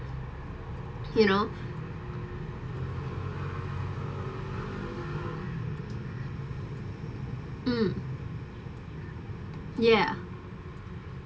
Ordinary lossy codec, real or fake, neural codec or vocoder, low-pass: none; real; none; none